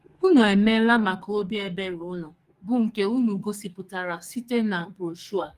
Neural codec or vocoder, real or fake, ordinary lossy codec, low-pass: codec, 44.1 kHz, 2.6 kbps, SNAC; fake; Opus, 32 kbps; 14.4 kHz